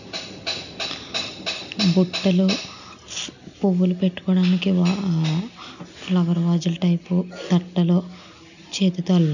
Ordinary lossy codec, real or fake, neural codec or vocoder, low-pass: none; real; none; 7.2 kHz